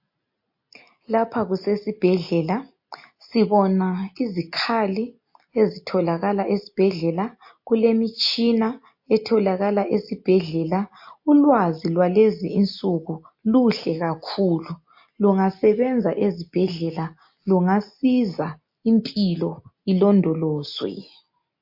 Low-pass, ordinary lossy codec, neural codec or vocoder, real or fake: 5.4 kHz; MP3, 32 kbps; none; real